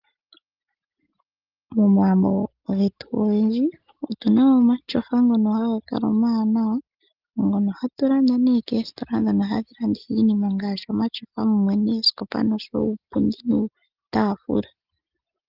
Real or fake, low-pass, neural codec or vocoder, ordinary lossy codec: real; 5.4 kHz; none; Opus, 24 kbps